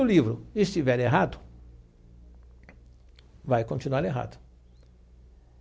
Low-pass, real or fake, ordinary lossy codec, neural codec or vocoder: none; real; none; none